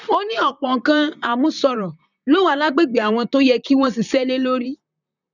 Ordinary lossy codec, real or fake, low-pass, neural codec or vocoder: none; fake; 7.2 kHz; vocoder, 44.1 kHz, 128 mel bands, Pupu-Vocoder